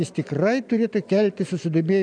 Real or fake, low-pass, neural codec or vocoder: real; 9.9 kHz; none